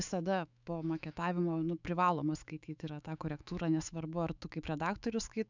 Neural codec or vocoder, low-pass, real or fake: autoencoder, 48 kHz, 128 numbers a frame, DAC-VAE, trained on Japanese speech; 7.2 kHz; fake